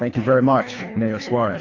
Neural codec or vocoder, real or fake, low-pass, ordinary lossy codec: codec, 24 kHz, 3 kbps, HILCodec; fake; 7.2 kHz; AAC, 32 kbps